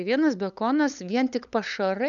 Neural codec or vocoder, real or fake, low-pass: codec, 16 kHz, 8 kbps, FunCodec, trained on LibriTTS, 25 frames a second; fake; 7.2 kHz